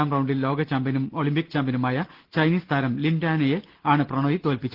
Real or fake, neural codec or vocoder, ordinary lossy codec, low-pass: real; none; Opus, 16 kbps; 5.4 kHz